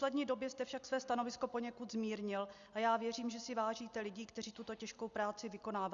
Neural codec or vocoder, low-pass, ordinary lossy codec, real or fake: none; 7.2 kHz; Opus, 64 kbps; real